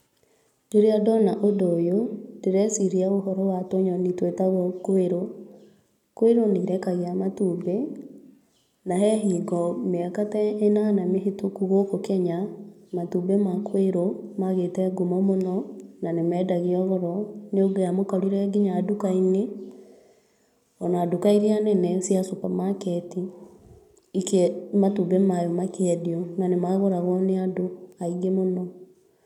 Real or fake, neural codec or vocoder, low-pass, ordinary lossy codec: real; none; 19.8 kHz; none